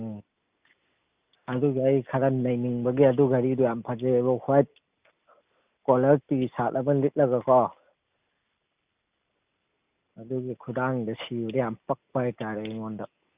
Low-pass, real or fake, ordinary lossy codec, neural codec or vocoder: 3.6 kHz; real; Opus, 64 kbps; none